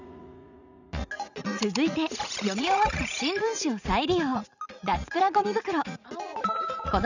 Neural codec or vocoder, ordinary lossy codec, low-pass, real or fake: vocoder, 44.1 kHz, 80 mel bands, Vocos; none; 7.2 kHz; fake